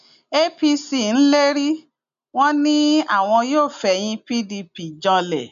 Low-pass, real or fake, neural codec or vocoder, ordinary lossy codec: 7.2 kHz; real; none; AAC, 96 kbps